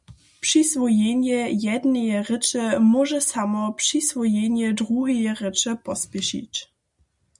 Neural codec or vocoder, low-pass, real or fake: none; 10.8 kHz; real